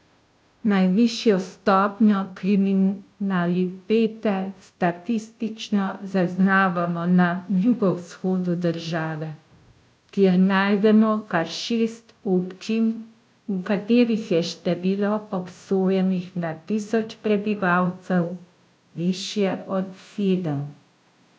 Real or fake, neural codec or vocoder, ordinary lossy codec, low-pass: fake; codec, 16 kHz, 0.5 kbps, FunCodec, trained on Chinese and English, 25 frames a second; none; none